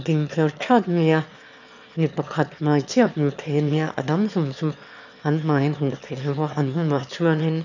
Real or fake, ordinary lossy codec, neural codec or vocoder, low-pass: fake; none; autoencoder, 22.05 kHz, a latent of 192 numbers a frame, VITS, trained on one speaker; 7.2 kHz